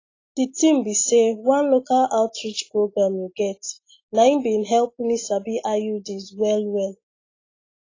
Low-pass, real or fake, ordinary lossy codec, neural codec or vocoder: 7.2 kHz; real; AAC, 32 kbps; none